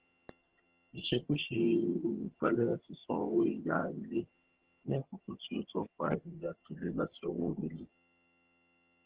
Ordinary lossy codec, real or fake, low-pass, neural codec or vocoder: Opus, 16 kbps; fake; 3.6 kHz; vocoder, 22.05 kHz, 80 mel bands, HiFi-GAN